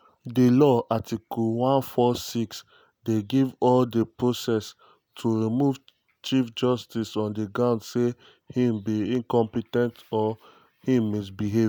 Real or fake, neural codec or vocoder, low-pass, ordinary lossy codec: real; none; none; none